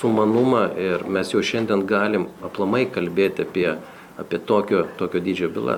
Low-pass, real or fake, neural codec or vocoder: 19.8 kHz; real; none